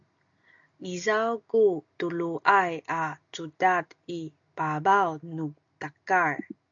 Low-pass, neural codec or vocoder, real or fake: 7.2 kHz; none; real